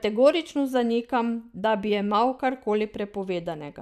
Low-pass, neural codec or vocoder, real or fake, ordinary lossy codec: 14.4 kHz; vocoder, 44.1 kHz, 128 mel bands every 512 samples, BigVGAN v2; fake; none